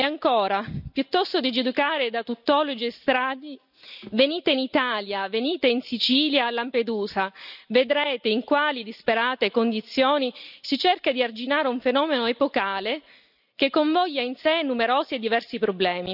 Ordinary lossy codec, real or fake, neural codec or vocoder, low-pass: none; real; none; 5.4 kHz